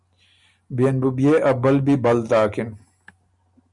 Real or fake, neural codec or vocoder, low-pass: real; none; 10.8 kHz